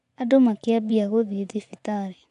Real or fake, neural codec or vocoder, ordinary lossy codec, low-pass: fake; vocoder, 24 kHz, 100 mel bands, Vocos; none; 10.8 kHz